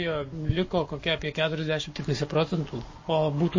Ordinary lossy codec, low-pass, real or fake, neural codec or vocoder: MP3, 32 kbps; 7.2 kHz; fake; codec, 44.1 kHz, 7.8 kbps, Pupu-Codec